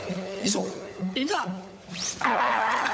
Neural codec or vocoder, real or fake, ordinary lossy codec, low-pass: codec, 16 kHz, 16 kbps, FunCodec, trained on LibriTTS, 50 frames a second; fake; none; none